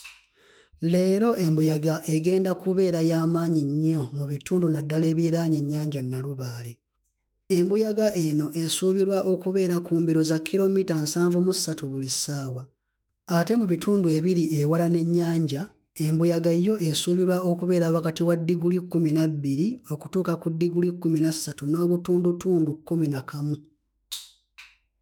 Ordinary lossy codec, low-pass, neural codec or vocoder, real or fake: none; none; autoencoder, 48 kHz, 32 numbers a frame, DAC-VAE, trained on Japanese speech; fake